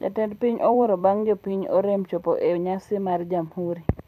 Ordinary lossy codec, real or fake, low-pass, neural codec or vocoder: none; fake; 14.4 kHz; vocoder, 44.1 kHz, 128 mel bands every 256 samples, BigVGAN v2